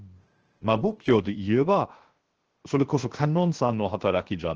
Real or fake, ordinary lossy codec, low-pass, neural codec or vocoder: fake; Opus, 16 kbps; 7.2 kHz; codec, 16 kHz, 0.7 kbps, FocalCodec